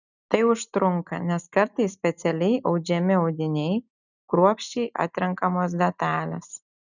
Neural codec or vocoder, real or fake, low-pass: none; real; 7.2 kHz